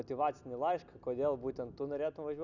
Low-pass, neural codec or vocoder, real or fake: 7.2 kHz; none; real